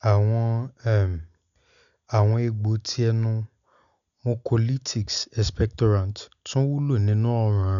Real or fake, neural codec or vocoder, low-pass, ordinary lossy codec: real; none; 7.2 kHz; none